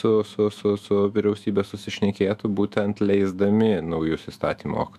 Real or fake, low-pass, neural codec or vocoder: fake; 14.4 kHz; autoencoder, 48 kHz, 128 numbers a frame, DAC-VAE, trained on Japanese speech